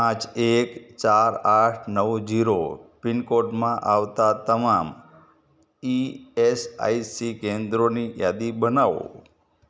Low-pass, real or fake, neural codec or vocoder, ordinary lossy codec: none; real; none; none